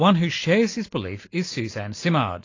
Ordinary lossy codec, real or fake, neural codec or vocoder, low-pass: AAC, 32 kbps; real; none; 7.2 kHz